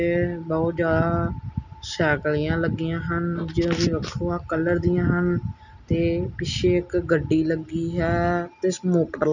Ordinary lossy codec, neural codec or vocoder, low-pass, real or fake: none; none; 7.2 kHz; real